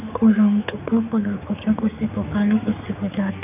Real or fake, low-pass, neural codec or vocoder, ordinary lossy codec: fake; 3.6 kHz; codec, 16 kHz, 4 kbps, X-Codec, HuBERT features, trained on general audio; none